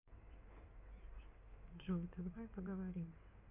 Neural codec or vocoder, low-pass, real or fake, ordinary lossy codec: codec, 16 kHz in and 24 kHz out, 2.2 kbps, FireRedTTS-2 codec; 3.6 kHz; fake; none